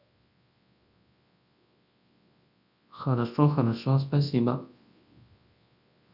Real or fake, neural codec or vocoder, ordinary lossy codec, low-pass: fake; codec, 24 kHz, 0.9 kbps, WavTokenizer, large speech release; none; 5.4 kHz